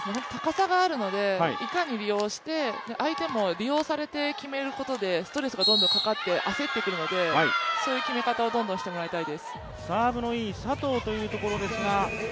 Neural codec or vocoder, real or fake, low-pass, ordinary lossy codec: none; real; none; none